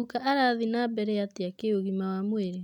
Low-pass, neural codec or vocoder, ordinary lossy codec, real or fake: 19.8 kHz; none; none; real